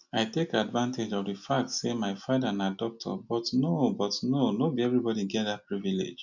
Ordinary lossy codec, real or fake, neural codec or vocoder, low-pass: none; real; none; 7.2 kHz